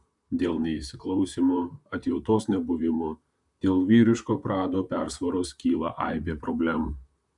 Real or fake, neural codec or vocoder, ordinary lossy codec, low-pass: fake; vocoder, 44.1 kHz, 128 mel bands, Pupu-Vocoder; MP3, 96 kbps; 10.8 kHz